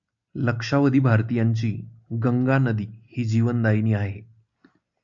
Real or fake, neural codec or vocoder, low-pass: real; none; 7.2 kHz